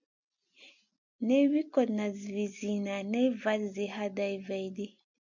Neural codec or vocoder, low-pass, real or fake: none; 7.2 kHz; real